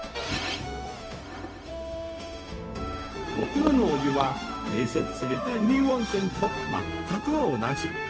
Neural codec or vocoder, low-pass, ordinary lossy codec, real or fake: codec, 16 kHz, 0.4 kbps, LongCat-Audio-Codec; none; none; fake